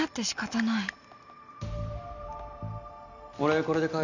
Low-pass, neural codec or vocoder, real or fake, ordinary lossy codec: 7.2 kHz; none; real; none